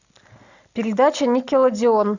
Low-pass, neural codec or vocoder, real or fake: 7.2 kHz; none; real